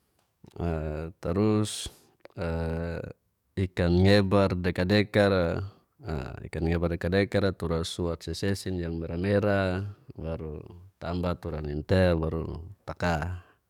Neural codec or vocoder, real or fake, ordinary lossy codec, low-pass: codec, 44.1 kHz, 7.8 kbps, DAC; fake; none; 19.8 kHz